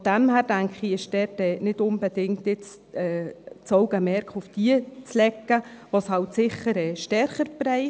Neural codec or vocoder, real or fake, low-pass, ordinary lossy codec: none; real; none; none